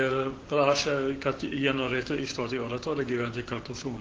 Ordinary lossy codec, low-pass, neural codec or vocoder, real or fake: Opus, 16 kbps; 7.2 kHz; codec, 16 kHz, 6 kbps, DAC; fake